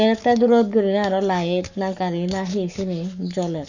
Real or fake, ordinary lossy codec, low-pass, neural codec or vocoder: fake; none; 7.2 kHz; codec, 44.1 kHz, 7.8 kbps, Pupu-Codec